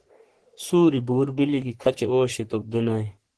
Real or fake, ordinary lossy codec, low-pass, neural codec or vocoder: fake; Opus, 16 kbps; 10.8 kHz; codec, 44.1 kHz, 3.4 kbps, Pupu-Codec